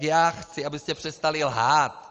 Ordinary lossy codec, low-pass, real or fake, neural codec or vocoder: Opus, 24 kbps; 7.2 kHz; real; none